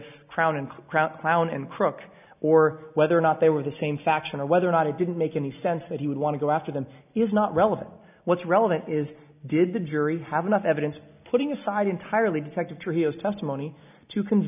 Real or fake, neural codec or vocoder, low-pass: real; none; 3.6 kHz